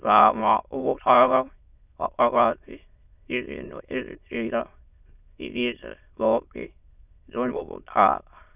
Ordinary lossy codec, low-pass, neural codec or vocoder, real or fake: none; 3.6 kHz; autoencoder, 22.05 kHz, a latent of 192 numbers a frame, VITS, trained on many speakers; fake